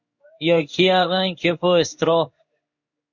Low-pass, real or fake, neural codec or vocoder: 7.2 kHz; fake; codec, 16 kHz in and 24 kHz out, 1 kbps, XY-Tokenizer